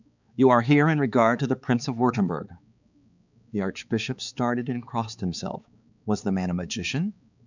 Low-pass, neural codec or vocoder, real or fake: 7.2 kHz; codec, 16 kHz, 4 kbps, X-Codec, HuBERT features, trained on balanced general audio; fake